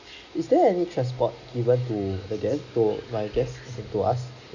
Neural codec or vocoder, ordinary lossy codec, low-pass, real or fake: none; none; 7.2 kHz; real